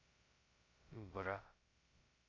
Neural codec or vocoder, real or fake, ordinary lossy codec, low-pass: codec, 16 kHz, 0.2 kbps, FocalCodec; fake; Opus, 64 kbps; 7.2 kHz